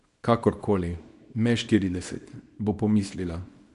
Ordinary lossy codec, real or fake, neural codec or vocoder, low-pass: MP3, 96 kbps; fake; codec, 24 kHz, 0.9 kbps, WavTokenizer, small release; 10.8 kHz